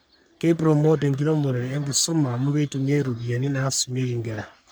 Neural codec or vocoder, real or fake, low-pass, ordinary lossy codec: codec, 44.1 kHz, 3.4 kbps, Pupu-Codec; fake; none; none